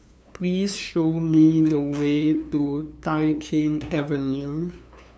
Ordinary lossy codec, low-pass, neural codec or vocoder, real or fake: none; none; codec, 16 kHz, 2 kbps, FunCodec, trained on LibriTTS, 25 frames a second; fake